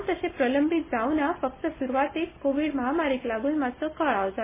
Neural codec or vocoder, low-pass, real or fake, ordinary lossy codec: none; 3.6 kHz; real; MP3, 16 kbps